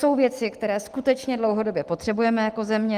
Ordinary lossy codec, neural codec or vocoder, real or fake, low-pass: Opus, 24 kbps; none; real; 14.4 kHz